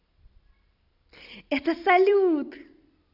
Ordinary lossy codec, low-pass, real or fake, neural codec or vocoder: none; 5.4 kHz; fake; vocoder, 44.1 kHz, 128 mel bands every 256 samples, BigVGAN v2